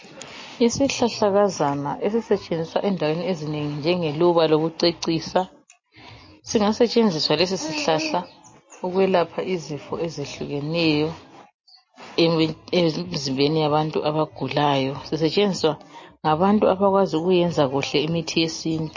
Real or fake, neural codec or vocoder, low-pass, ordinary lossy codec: real; none; 7.2 kHz; MP3, 32 kbps